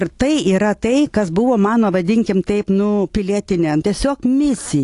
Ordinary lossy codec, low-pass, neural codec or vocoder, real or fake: AAC, 48 kbps; 10.8 kHz; none; real